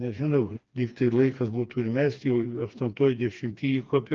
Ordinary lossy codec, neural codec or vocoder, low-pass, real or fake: Opus, 24 kbps; codec, 16 kHz, 2 kbps, FreqCodec, smaller model; 7.2 kHz; fake